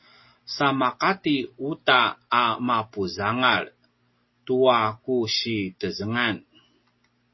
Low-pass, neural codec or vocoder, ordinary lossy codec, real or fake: 7.2 kHz; none; MP3, 24 kbps; real